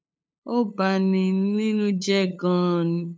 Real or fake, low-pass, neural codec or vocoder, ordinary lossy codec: fake; none; codec, 16 kHz, 8 kbps, FunCodec, trained on LibriTTS, 25 frames a second; none